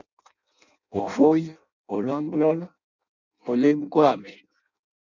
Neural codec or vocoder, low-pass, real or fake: codec, 16 kHz in and 24 kHz out, 0.6 kbps, FireRedTTS-2 codec; 7.2 kHz; fake